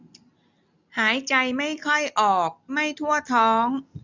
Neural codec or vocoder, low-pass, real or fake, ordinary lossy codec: none; 7.2 kHz; real; none